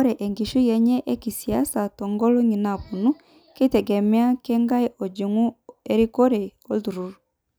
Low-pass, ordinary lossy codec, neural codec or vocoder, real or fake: none; none; none; real